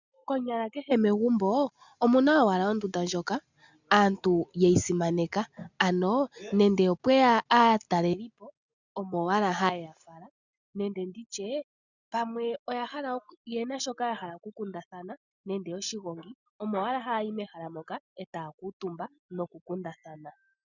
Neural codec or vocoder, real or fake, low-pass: none; real; 7.2 kHz